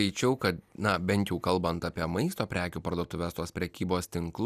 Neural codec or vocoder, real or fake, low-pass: none; real; 14.4 kHz